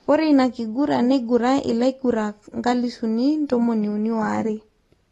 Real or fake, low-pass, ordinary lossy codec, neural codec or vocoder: real; 19.8 kHz; AAC, 32 kbps; none